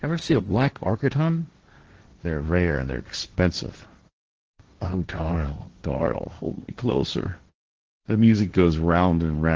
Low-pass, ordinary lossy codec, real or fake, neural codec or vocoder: 7.2 kHz; Opus, 16 kbps; fake; codec, 16 kHz, 1.1 kbps, Voila-Tokenizer